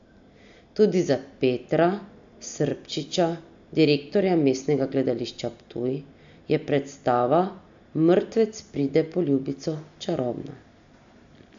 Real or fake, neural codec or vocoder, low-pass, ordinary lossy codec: real; none; 7.2 kHz; AAC, 64 kbps